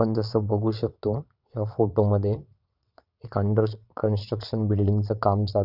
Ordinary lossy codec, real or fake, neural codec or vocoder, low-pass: none; fake; vocoder, 22.05 kHz, 80 mel bands, WaveNeXt; 5.4 kHz